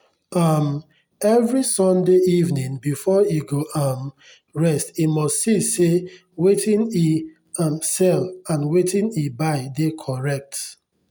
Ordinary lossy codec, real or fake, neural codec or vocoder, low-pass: none; real; none; none